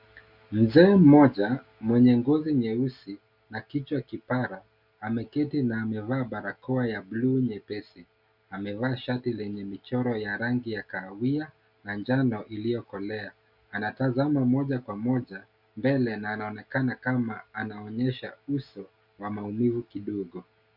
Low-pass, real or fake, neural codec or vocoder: 5.4 kHz; real; none